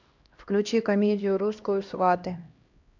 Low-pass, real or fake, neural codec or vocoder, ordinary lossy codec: 7.2 kHz; fake; codec, 16 kHz, 1 kbps, X-Codec, HuBERT features, trained on LibriSpeech; MP3, 64 kbps